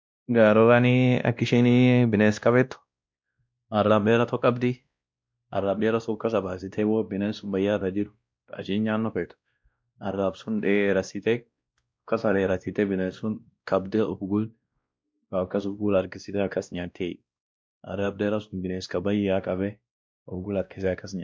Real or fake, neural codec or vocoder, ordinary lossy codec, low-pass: fake; codec, 16 kHz, 1 kbps, X-Codec, WavLM features, trained on Multilingual LibriSpeech; none; none